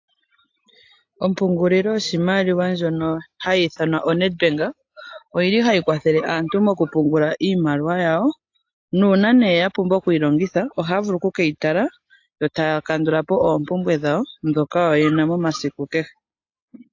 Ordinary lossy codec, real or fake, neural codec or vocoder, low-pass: AAC, 48 kbps; real; none; 7.2 kHz